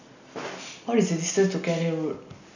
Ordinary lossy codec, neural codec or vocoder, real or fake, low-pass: none; none; real; 7.2 kHz